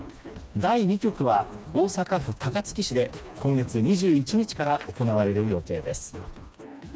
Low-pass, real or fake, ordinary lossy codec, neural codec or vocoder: none; fake; none; codec, 16 kHz, 2 kbps, FreqCodec, smaller model